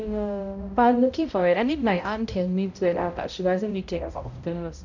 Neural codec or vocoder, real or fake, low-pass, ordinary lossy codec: codec, 16 kHz, 0.5 kbps, X-Codec, HuBERT features, trained on balanced general audio; fake; 7.2 kHz; none